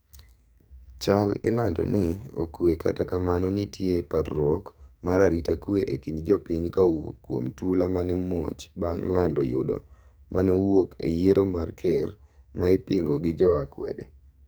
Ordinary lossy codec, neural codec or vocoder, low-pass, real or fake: none; codec, 44.1 kHz, 2.6 kbps, SNAC; none; fake